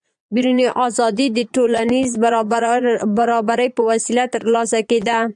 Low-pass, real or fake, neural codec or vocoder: 9.9 kHz; fake; vocoder, 22.05 kHz, 80 mel bands, Vocos